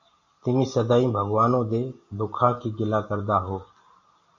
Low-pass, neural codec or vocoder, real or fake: 7.2 kHz; none; real